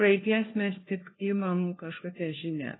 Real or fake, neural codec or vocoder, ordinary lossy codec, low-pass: fake; codec, 16 kHz, 1 kbps, FunCodec, trained on LibriTTS, 50 frames a second; AAC, 16 kbps; 7.2 kHz